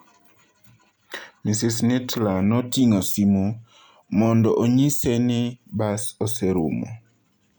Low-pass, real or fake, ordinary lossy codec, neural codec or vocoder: none; real; none; none